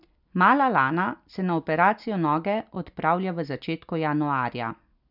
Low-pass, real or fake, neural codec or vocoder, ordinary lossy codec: 5.4 kHz; real; none; none